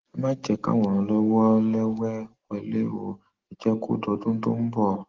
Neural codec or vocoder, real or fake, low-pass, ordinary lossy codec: none; real; 7.2 kHz; Opus, 16 kbps